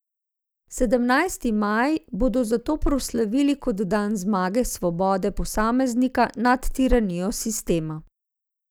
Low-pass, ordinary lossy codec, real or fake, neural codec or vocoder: none; none; real; none